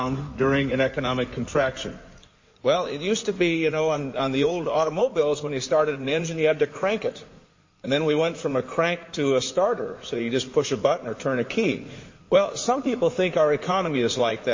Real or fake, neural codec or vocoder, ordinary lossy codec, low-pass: fake; codec, 16 kHz in and 24 kHz out, 2.2 kbps, FireRedTTS-2 codec; MP3, 32 kbps; 7.2 kHz